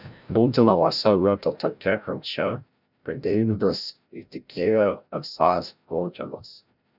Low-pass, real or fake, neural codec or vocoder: 5.4 kHz; fake; codec, 16 kHz, 0.5 kbps, FreqCodec, larger model